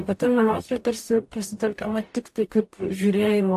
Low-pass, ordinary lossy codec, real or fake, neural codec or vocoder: 14.4 kHz; AAC, 64 kbps; fake; codec, 44.1 kHz, 0.9 kbps, DAC